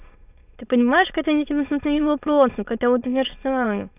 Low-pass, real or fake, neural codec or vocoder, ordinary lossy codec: 3.6 kHz; fake; autoencoder, 22.05 kHz, a latent of 192 numbers a frame, VITS, trained on many speakers; none